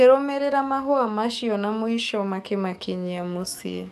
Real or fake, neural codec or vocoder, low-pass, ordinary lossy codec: fake; codec, 44.1 kHz, 7.8 kbps, DAC; 14.4 kHz; none